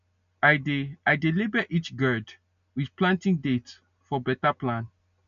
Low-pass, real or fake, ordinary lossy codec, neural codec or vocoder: 7.2 kHz; real; none; none